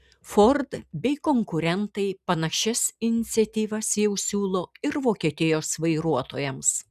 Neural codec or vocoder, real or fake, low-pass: none; real; 14.4 kHz